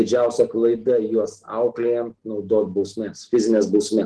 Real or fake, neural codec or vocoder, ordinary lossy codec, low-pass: real; none; Opus, 16 kbps; 10.8 kHz